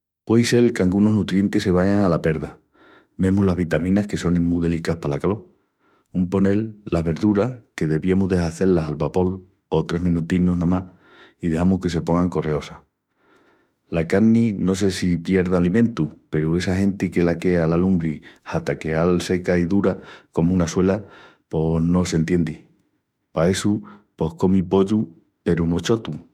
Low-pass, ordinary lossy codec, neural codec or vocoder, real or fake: 19.8 kHz; none; autoencoder, 48 kHz, 32 numbers a frame, DAC-VAE, trained on Japanese speech; fake